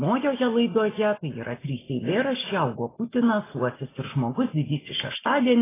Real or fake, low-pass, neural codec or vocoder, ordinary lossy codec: real; 3.6 kHz; none; AAC, 16 kbps